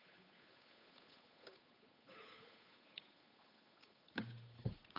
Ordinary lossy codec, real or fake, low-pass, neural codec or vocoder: none; fake; 5.4 kHz; codec, 16 kHz, 8 kbps, FunCodec, trained on Chinese and English, 25 frames a second